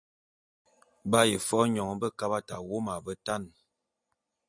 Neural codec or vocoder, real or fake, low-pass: none; real; 9.9 kHz